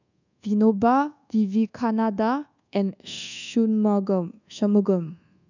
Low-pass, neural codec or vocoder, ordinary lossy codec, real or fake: 7.2 kHz; codec, 24 kHz, 1.2 kbps, DualCodec; none; fake